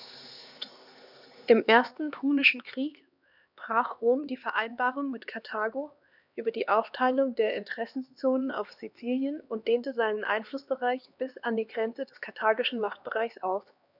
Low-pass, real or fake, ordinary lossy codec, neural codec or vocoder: 5.4 kHz; fake; none; codec, 16 kHz, 2 kbps, X-Codec, HuBERT features, trained on LibriSpeech